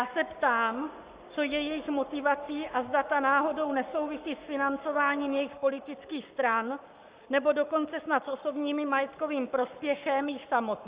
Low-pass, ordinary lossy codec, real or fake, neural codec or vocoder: 3.6 kHz; Opus, 64 kbps; fake; codec, 44.1 kHz, 7.8 kbps, Pupu-Codec